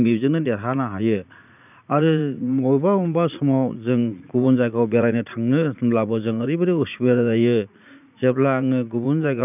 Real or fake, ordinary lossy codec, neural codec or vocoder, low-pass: fake; none; vocoder, 44.1 kHz, 80 mel bands, Vocos; 3.6 kHz